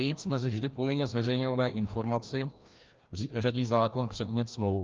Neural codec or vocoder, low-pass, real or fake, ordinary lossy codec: codec, 16 kHz, 1 kbps, FreqCodec, larger model; 7.2 kHz; fake; Opus, 24 kbps